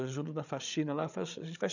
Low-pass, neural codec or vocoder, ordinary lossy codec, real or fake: 7.2 kHz; codec, 16 kHz, 8 kbps, FreqCodec, larger model; none; fake